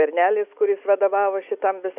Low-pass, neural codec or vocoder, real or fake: 3.6 kHz; none; real